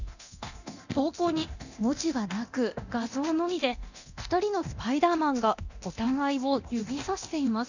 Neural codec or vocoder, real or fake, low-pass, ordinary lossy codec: codec, 24 kHz, 0.9 kbps, DualCodec; fake; 7.2 kHz; none